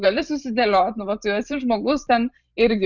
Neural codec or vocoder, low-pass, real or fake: vocoder, 44.1 kHz, 128 mel bands every 512 samples, BigVGAN v2; 7.2 kHz; fake